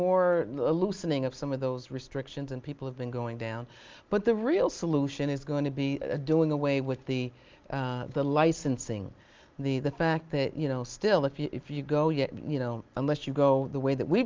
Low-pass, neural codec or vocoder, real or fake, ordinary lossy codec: 7.2 kHz; none; real; Opus, 24 kbps